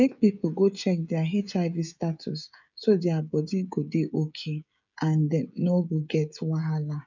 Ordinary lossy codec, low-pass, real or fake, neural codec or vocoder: none; 7.2 kHz; fake; codec, 16 kHz, 16 kbps, FreqCodec, smaller model